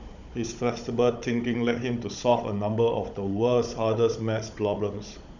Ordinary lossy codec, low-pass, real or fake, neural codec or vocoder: none; 7.2 kHz; fake; codec, 16 kHz, 16 kbps, FunCodec, trained on Chinese and English, 50 frames a second